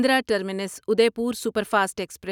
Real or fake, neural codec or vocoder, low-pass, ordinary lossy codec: real; none; 19.8 kHz; none